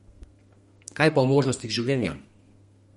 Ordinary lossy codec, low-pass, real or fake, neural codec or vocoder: MP3, 48 kbps; 14.4 kHz; fake; codec, 32 kHz, 1.9 kbps, SNAC